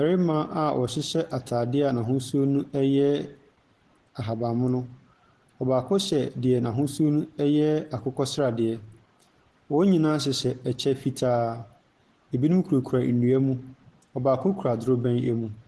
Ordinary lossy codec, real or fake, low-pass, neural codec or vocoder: Opus, 16 kbps; real; 10.8 kHz; none